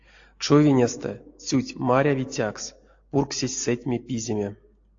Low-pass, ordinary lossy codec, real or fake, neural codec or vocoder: 7.2 kHz; AAC, 48 kbps; real; none